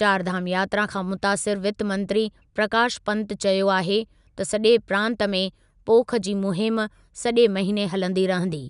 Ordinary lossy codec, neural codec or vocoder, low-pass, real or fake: none; none; 10.8 kHz; real